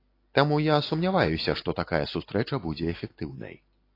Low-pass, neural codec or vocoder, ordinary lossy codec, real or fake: 5.4 kHz; none; AAC, 32 kbps; real